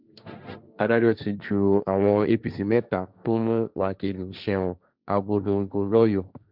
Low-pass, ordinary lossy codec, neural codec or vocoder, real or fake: 5.4 kHz; none; codec, 16 kHz, 1.1 kbps, Voila-Tokenizer; fake